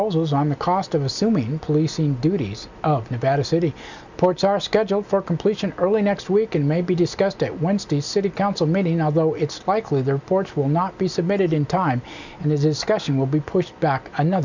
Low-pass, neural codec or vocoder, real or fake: 7.2 kHz; none; real